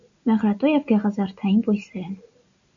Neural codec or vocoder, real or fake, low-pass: none; real; 7.2 kHz